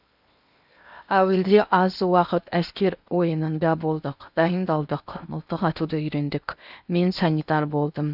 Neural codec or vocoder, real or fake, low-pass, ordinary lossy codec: codec, 16 kHz in and 24 kHz out, 0.8 kbps, FocalCodec, streaming, 65536 codes; fake; 5.4 kHz; none